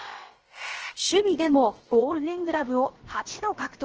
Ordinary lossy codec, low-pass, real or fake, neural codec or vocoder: Opus, 16 kbps; 7.2 kHz; fake; codec, 16 kHz, about 1 kbps, DyCAST, with the encoder's durations